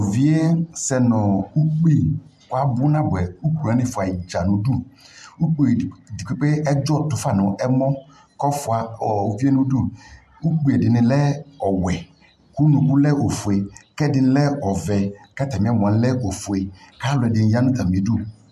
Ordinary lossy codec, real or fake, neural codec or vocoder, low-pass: MP3, 64 kbps; real; none; 14.4 kHz